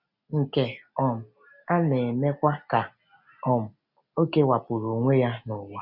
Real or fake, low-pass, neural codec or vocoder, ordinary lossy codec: real; 5.4 kHz; none; none